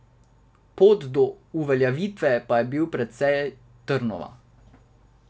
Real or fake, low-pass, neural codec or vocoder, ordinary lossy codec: real; none; none; none